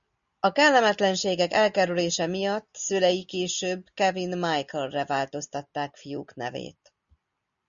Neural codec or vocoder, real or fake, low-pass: none; real; 7.2 kHz